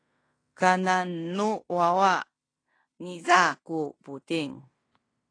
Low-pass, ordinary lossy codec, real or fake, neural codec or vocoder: 9.9 kHz; AAC, 32 kbps; fake; codec, 16 kHz in and 24 kHz out, 0.9 kbps, LongCat-Audio-Codec, fine tuned four codebook decoder